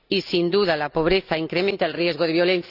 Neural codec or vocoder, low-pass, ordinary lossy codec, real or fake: none; 5.4 kHz; none; real